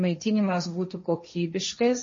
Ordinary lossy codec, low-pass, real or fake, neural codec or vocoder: MP3, 32 kbps; 7.2 kHz; fake; codec, 16 kHz, 1.1 kbps, Voila-Tokenizer